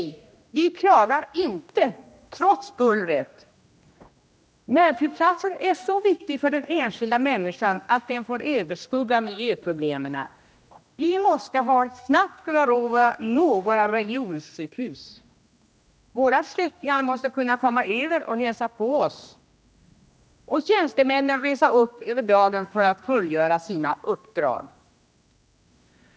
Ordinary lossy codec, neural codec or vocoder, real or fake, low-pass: none; codec, 16 kHz, 1 kbps, X-Codec, HuBERT features, trained on general audio; fake; none